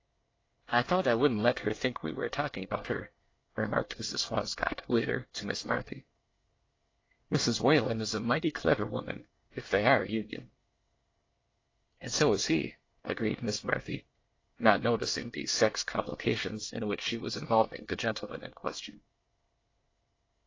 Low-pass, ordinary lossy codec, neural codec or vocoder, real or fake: 7.2 kHz; AAC, 32 kbps; codec, 24 kHz, 1 kbps, SNAC; fake